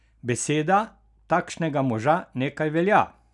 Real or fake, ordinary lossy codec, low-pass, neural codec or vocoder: real; none; 10.8 kHz; none